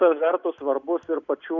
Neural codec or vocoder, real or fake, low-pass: none; real; 7.2 kHz